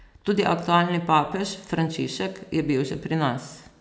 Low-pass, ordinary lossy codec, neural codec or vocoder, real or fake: none; none; none; real